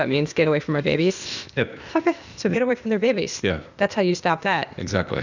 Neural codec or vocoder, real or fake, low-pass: codec, 16 kHz, 0.8 kbps, ZipCodec; fake; 7.2 kHz